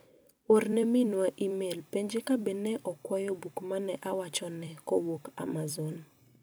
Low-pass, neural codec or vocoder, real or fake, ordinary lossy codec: none; vocoder, 44.1 kHz, 128 mel bands every 256 samples, BigVGAN v2; fake; none